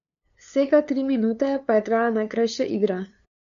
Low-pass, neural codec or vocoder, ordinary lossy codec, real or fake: 7.2 kHz; codec, 16 kHz, 2 kbps, FunCodec, trained on LibriTTS, 25 frames a second; none; fake